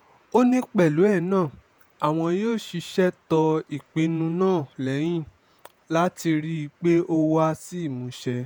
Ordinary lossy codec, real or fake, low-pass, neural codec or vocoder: none; fake; none; vocoder, 48 kHz, 128 mel bands, Vocos